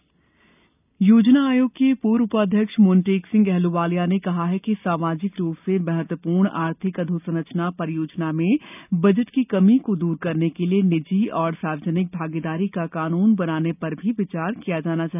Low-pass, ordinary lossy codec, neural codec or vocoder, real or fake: 3.6 kHz; none; none; real